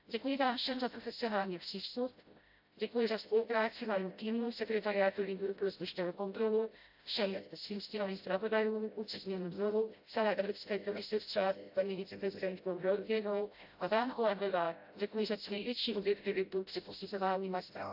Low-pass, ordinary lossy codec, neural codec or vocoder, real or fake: 5.4 kHz; AAC, 48 kbps; codec, 16 kHz, 0.5 kbps, FreqCodec, smaller model; fake